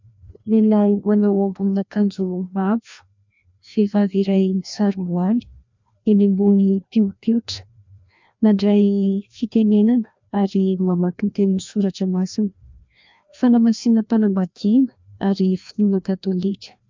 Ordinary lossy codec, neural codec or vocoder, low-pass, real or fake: MP3, 64 kbps; codec, 16 kHz, 1 kbps, FreqCodec, larger model; 7.2 kHz; fake